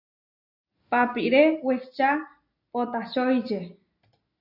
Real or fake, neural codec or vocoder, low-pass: real; none; 5.4 kHz